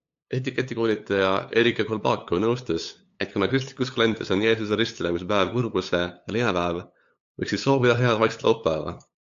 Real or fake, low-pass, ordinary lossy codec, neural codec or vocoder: fake; 7.2 kHz; AAC, 64 kbps; codec, 16 kHz, 8 kbps, FunCodec, trained on LibriTTS, 25 frames a second